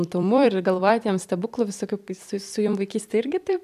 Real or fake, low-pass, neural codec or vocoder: fake; 14.4 kHz; vocoder, 44.1 kHz, 128 mel bands every 256 samples, BigVGAN v2